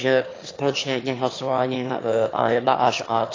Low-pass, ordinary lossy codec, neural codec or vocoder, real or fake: 7.2 kHz; AAC, 32 kbps; autoencoder, 22.05 kHz, a latent of 192 numbers a frame, VITS, trained on one speaker; fake